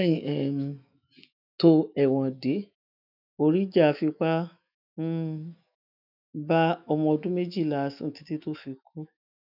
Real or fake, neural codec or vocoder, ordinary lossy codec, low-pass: fake; autoencoder, 48 kHz, 128 numbers a frame, DAC-VAE, trained on Japanese speech; none; 5.4 kHz